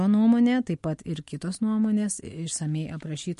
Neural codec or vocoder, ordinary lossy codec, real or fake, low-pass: none; MP3, 48 kbps; real; 14.4 kHz